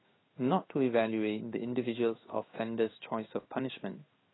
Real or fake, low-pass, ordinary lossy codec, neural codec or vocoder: fake; 7.2 kHz; AAC, 16 kbps; codec, 16 kHz in and 24 kHz out, 1 kbps, XY-Tokenizer